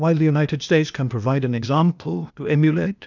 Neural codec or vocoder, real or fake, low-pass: codec, 16 kHz, 0.8 kbps, ZipCodec; fake; 7.2 kHz